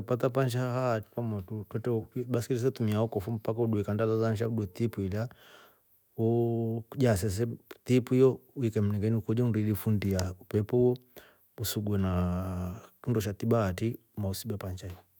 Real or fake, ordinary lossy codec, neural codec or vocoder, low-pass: fake; none; autoencoder, 48 kHz, 128 numbers a frame, DAC-VAE, trained on Japanese speech; none